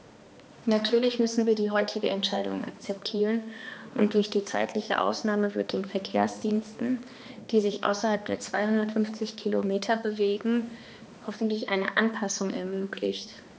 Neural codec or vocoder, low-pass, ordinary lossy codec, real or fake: codec, 16 kHz, 2 kbps, X-Codec, HuBERT features, trained on balanced general audio; none; none; fake